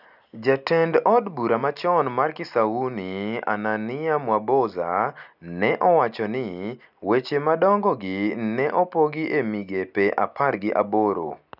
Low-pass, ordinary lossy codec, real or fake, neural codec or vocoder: 5.4 kHz; none; real; none